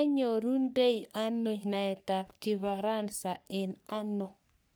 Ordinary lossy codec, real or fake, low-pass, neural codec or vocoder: none; fake; none; codec, 44.1 kHz, 3.4 kbps, Pupu-Codec